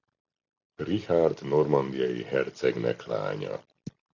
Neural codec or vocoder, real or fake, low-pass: none; real; 7.2 kHz